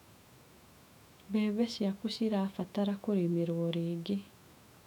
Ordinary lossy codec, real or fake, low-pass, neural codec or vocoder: none; fake; 19.8 kHz; autoencoder, 48 kHz, 128 numbers a frame, DAC-VAE, trained on Japanese speech